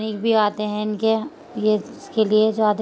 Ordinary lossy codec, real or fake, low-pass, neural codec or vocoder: none; real; none; none